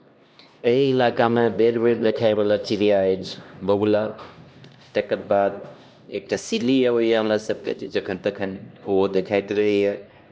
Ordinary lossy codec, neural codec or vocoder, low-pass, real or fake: none; codec, 16 kHz, 1 kbps, X-Codec, HuBERT features, trained on LibriSpeech; none; fake